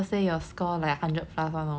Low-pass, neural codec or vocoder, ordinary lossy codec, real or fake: none; none; none; real